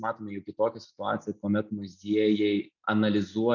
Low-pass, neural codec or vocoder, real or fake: 7.2 kHz; none; real